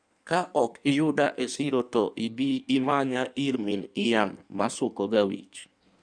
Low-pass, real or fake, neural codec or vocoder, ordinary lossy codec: 9.9 kHz; fake; codec, 16 kHz in and 24 kHz out, 1.1 kbps, FireRedTTS-2 codec; none